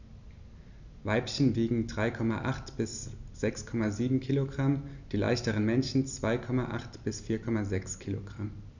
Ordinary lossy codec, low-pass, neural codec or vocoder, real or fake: none; 7.2 kHz; none; real